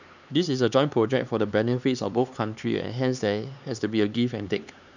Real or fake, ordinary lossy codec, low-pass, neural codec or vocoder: fake; none; 7.2 kHz; codec, 16 kHz, 4 kbps, X-Codec, HuBERT features, trained on LibriSpeech